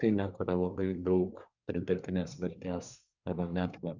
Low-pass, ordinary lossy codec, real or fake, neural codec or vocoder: 7.2 kHz; Opus, 64 kbps; fake; codec, 24 kHz, 1 kbps, SNAC